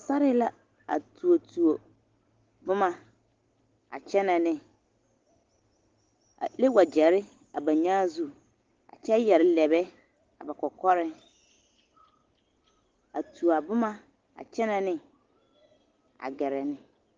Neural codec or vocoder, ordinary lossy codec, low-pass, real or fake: none; Opus, 16 kbps; 7.2 kHz; real